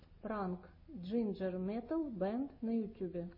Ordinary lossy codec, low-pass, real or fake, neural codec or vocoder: MP3, 24 kbps; 5.4 kHz; real; none